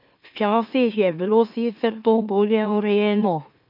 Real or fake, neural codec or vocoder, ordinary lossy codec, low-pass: fake; autoencoder, 44.1 kHz, a latent of 192 numbers a frame, MeloTTS; none; 5.4 kHz